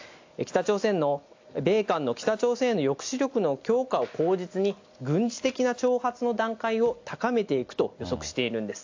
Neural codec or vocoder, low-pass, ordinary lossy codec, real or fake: none; 7.2 kHz; AAC, 48 kbps; real